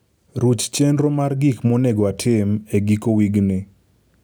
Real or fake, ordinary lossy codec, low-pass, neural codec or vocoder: real; none; none; none